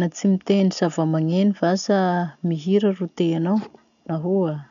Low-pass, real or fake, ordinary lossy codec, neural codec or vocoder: 7.2 kHz; real; none; none